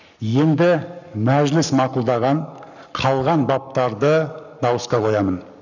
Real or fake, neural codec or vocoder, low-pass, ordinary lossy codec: fake; codec, 44.1 kHz, 7.8 kbps, Pupu-Codec; 7.2 kHz; none